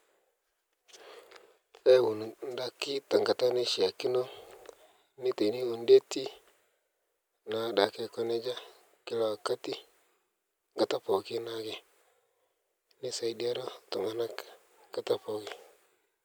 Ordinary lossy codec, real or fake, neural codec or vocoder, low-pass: none; real; none; none